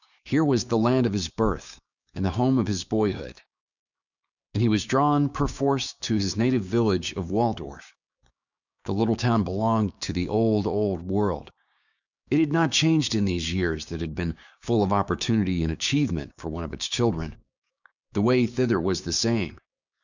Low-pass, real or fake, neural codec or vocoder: 7.2 kHz; fake; codec, 16 kHz, 6 kbps, DAC